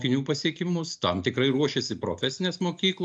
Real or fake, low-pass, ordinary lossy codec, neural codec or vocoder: real; 9.9 kHz; MP3, 64 kbps; none